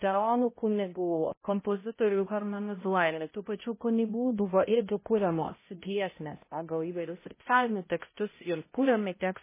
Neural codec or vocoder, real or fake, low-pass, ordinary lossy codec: codec, 16 kHz, 0.5 kbps, X-Codec, HuBERT features, trained on balanced general audio; fake; 3.6 kHz; MP3, 16 kbps